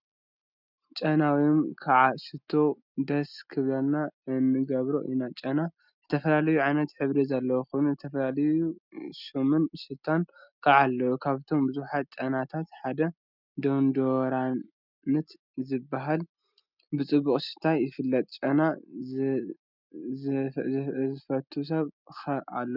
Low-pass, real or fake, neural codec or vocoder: 5.4 kHz; real; none